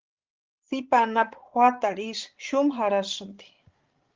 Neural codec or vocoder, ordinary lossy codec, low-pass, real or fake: codec, 16 kHz, 16 kbps, FreqCodec, larger model; Opus, 16 kbps; 7.2 kHz; fake